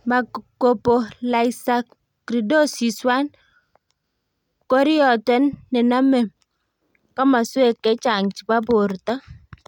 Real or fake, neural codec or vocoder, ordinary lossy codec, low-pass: real; none; none; 19.8 kHz